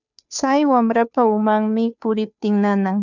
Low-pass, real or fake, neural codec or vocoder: 7.2 kHz; fake; codec, 16 kHz, 2 kbps, FunCodec, trained on Chinese and English, 25 frames a second